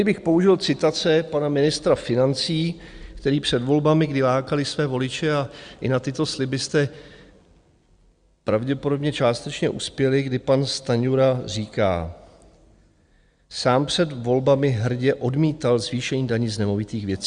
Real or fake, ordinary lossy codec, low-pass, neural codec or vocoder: real; Opus, 64 kbps; 9.9 kHz; none